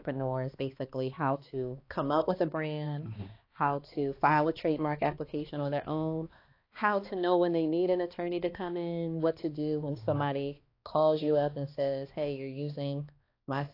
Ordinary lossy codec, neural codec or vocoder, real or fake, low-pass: AAC, 32 kbps; codec, 16 kHz, 2 kbps, X-Codec, HuBERT features, trained on balanced general audio; fake; 5.4 kHz